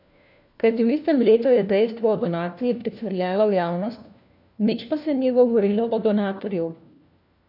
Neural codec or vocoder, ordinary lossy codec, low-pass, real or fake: codec, 16 kHz, 1 kbps, FunCodec, trained on LibriTTS, 50 frames a second; none; 5.4 kHz; fake